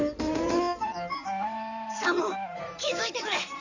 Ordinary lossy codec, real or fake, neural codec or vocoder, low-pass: none; fake; codec, 16 kHz in and 24 kHz out, 2.2 kbps, FireRedTTS-2 codec; 7.2 kHz